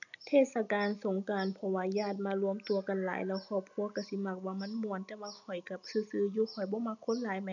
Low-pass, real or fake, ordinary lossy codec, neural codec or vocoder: 7.2 kHz; real; none; none